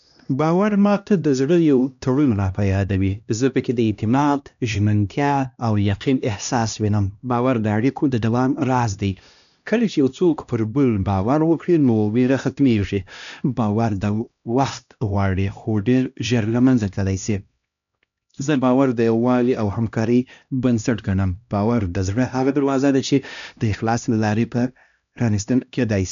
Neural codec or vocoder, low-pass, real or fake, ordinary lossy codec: codec, 16 kHz, 1 kbps, X-Codec, HuBERT features, trained on LibriSpeech; 7.2 kHz; fake; none